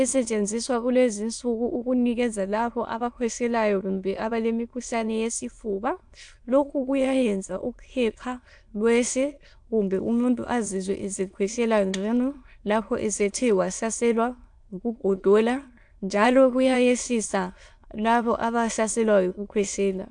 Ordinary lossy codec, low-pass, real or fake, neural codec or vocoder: AAC, 64 kbps; 9.9 kHz; fake; autoencoder, 22.05 kHz, a latent of 192 numbers a frame, VITS, trained on many speakers